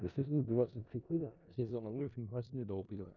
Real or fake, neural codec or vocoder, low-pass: fake; codec, 16 kHz in and 24 kHz out, 0.4 kbps, LongCat-Audio-Codec, four codebook decoder; 7.2 kHz